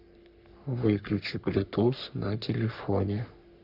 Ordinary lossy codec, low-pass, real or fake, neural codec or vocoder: none; 5.4 kHz; fake; codec, 44.1 kHz, 3.4 kbps, Pupu-Codec